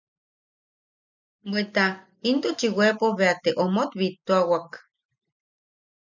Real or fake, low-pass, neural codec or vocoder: real; 7.2 kHz; none